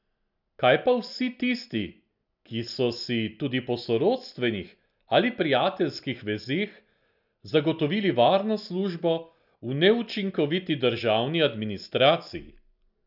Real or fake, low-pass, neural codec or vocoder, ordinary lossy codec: real; 5.4 kHz; none; none